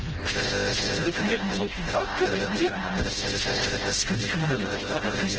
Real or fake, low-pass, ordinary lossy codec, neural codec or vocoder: fake; 7.2 kHz; Opus, 16 kbps; codec, 16 kHz, 0.5 kbps, FreqCodec, smaller model